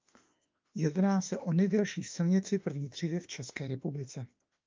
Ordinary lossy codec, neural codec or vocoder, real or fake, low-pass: Opus, 24 kbps; autoencoder, 48 kHz, 32 numbers a frame, DAC-VAE, trained on Japanese speech; fake; 7.2 kHz